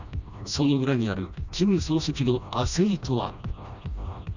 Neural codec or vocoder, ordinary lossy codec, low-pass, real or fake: codec, 16 kHz, 1 kbps, FreqCodec, smaller model; none; 7.2 kHz; fake